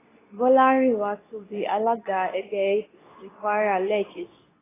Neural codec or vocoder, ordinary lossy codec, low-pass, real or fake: codec, 24 kHz, 0.9 kbps, WavTokenizer, medium speech release version 2; AAC, 16 kbps; 3.6 kHz; fake